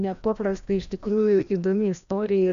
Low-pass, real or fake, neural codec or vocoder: 7.2 kHz; fake; codec, 16 kHz, 1 kbps, FreqCodec, larger model